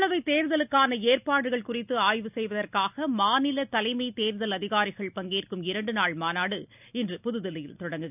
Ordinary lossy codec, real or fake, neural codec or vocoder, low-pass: none; real; none; 3.6 kHz